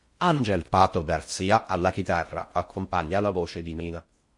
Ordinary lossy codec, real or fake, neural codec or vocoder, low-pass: MP3, 48 kbps; fake; codec, 16 kHz in and 24 kHz out, 0.6 kbps, FocalCodec, streaming, 4096 codes; 10.8 kHz